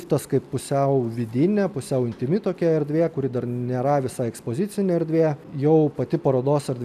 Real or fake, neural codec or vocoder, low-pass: real; none; 14.4 kHz